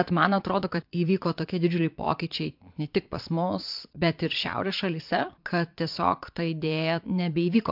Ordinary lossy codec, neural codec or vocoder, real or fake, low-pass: MP3, 48 kbps; vocoder, 22.05 kHz, 80 mel bands, Vocos; fake; 5.4 kHz